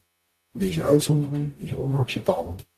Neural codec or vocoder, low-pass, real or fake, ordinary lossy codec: codec, 44.1 kHz, 0.9 kbps, DAC; 14.4 kHz; fake; AAC, 64 kbps